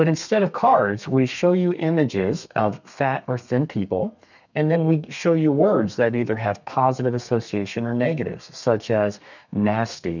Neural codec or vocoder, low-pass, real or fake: codec, 32 kHz, 1.9 kbps, SNAC; 7.2 kHz; fake